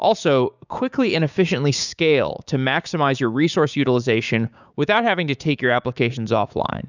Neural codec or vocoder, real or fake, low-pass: codec, 16 kHz, 6 kbps, DAC; fake; 7.2 kHz